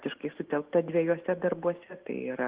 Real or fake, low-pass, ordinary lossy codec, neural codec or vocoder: real; 3.6 kHz; Opus, 16 kbps; none